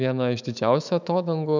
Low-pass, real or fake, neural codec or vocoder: 7.2 kHz; fake; autoencoder, 48 kHz, 128 numbers a frame, DAC-VAE, trained on Japanese speech